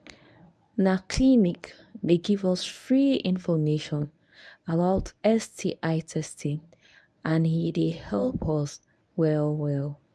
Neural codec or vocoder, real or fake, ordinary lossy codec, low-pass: codec, 24 kHz, 0.9 kbps, WavTokenizer, medium speech release version 1; fake; none; none